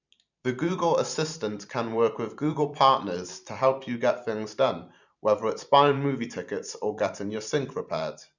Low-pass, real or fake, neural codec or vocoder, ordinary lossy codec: 7.2 kHz; fake; vocoder, 44.1 kHz, 128 mel bands every 512 samples, BigVGAN v2; none